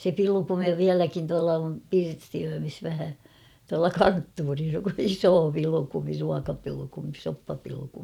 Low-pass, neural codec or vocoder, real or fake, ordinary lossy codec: 19.8 kHz; vocoder, 44.1 kHz, 128 mel bands every 512 samples, BigVGAN v2; fake; none